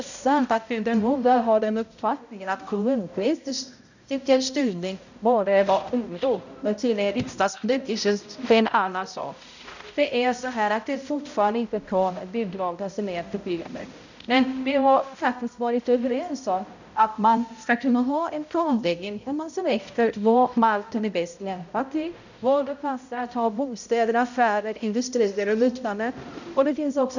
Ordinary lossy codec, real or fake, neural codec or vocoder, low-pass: none; fake; codec, 16 kHz, 0.5 kbps, X-Codec, HuBERT features, trained on balanced general audio; 7.2 kHz